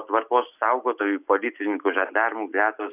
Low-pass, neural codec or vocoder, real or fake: 3.6 kHz; none; real